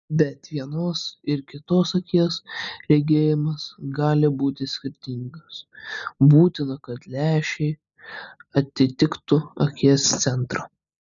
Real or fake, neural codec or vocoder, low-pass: real; none; 7.2 kHz